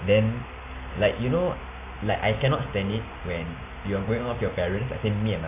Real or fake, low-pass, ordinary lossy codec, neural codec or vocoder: real; 3.6 kHz; AAC, 24 kbps; none